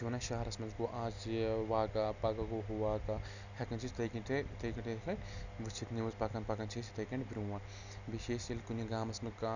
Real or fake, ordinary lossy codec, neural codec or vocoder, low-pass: real; none; none; 7.2 kHz